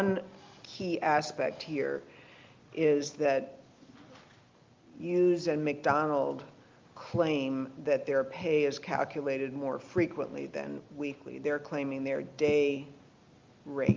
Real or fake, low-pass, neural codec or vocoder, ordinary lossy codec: real; 7.2 kHz; none; Opus, 24 kbps